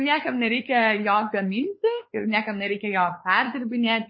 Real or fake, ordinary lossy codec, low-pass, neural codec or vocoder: fake; MP3, 24 kbps; 7.2 kHz; codec, 16 kHz, 4 kbps, X-Codec, WavLM features, trained on Multilingual LibriSpeech